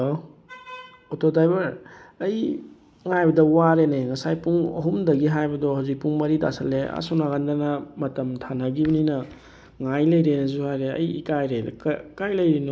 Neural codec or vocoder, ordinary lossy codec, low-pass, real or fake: none; none; none; real